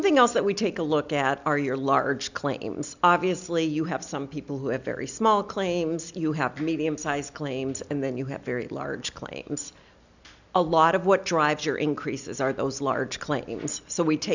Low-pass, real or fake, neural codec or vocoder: 7.2 kHz; real; none